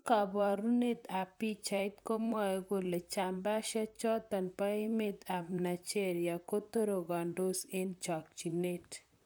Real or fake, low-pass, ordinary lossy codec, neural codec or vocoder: fake; none; none; vocoder, 44.1 kHz, 128 mel bands, Pupu-Vocoder